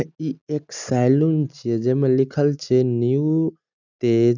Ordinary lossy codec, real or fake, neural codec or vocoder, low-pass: none; real; none; 7.2 kHz